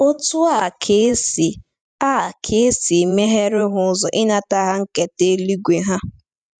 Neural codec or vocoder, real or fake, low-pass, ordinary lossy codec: vocoder, 44.1 kHz, 128 mel bands every 512 samples, BigVGAN v2; fake; 9.9 kHz; none